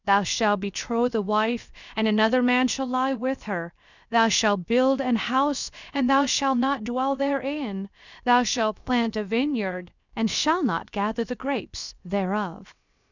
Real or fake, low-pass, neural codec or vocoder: fake; 7.2 kHz; codec, 16 kHz, 0.7 kbps, FocalCodec